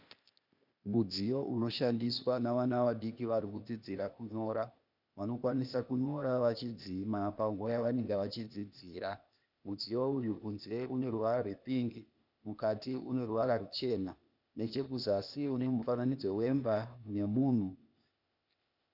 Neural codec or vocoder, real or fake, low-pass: codec, 16 kHz, 0.8 kbps, ZipCodec; fake; 5.4 kHz